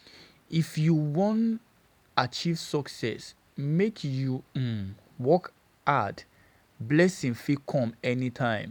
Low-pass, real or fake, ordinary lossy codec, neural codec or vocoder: 19.8 kHz; real; none; none